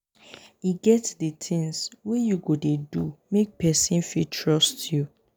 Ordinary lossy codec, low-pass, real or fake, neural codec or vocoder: none; none; real; none